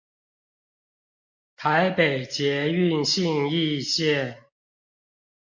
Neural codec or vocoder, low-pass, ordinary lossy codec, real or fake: none; 7.2 kHz; MP3, 48 kbps; real